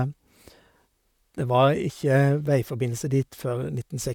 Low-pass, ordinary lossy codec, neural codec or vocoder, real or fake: 19.8 kHz; none; vocoder, 44.1 kHz, 128 mel bands, Pupu-Vocoder; fake